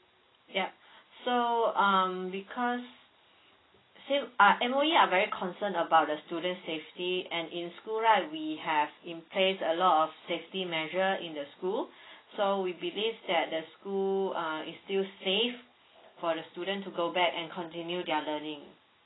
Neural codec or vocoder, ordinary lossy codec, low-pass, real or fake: none; AAC, 16 kbps; 7.2 kHz; real